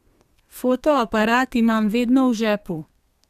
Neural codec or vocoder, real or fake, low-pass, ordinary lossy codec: codec, 32 kHz, 1.9 kbps, SNAC; fake; 14.4 kHz; MP3, 96 kbps